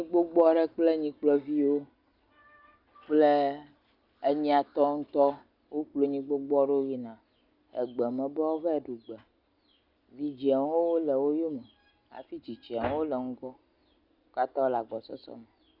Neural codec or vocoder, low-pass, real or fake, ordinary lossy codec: none; 5.4 kHz; real; Opus, 64 kbps